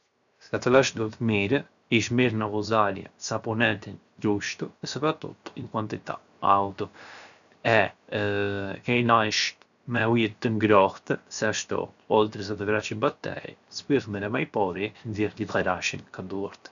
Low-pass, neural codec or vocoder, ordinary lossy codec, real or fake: 7.2 kHz; codec, 16 kHz, 0.7 kbps, FocalCodec; none; fake